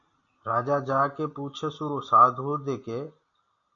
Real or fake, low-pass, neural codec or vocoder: real; 7.2 kHz; none